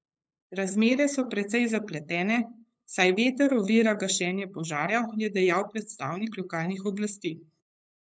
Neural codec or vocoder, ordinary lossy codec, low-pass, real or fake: codec, 16 kHz, 8 kbps, FunCodec, trained on LibriTTS, 25 frames a second; none; none; fake